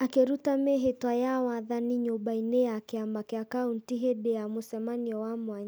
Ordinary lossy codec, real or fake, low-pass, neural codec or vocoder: none; real; none; none